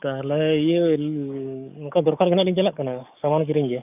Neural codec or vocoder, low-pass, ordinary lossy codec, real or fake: codec, 44.1 kHz, 7.8 kbps, DAC; 3.6 kHz; none; fake